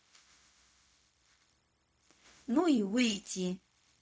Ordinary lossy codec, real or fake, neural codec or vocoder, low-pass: none; fake; codec, 16 kHz, 0.4 kbps, LongCat-Audio-Codec; none